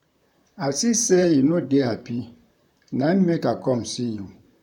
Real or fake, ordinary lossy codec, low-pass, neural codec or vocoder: fake; none; 19.8 kHz; vocoder, 44.1 kHz, 128 mel bands, Pupu-Vocoder